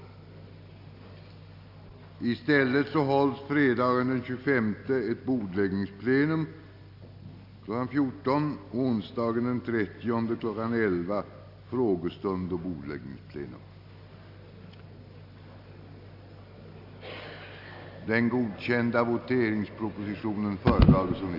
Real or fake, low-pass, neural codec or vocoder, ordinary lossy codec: real; 5.4 kHz; none; none